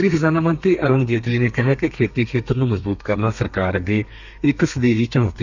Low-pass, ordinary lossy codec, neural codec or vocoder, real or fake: 7.2 kHz; none; codec, 32 kHz, 1.9 kbps, SNAC; fake